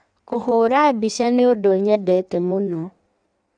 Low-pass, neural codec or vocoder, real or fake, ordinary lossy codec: 9.9 kHz; codec, 16 kHz in and 24 kHz out, 1.1 kbps, FireRedTTS-2 codec; fake; none